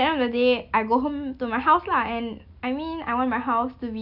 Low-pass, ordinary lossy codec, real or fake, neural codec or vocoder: 5.4 kHz; none; real; none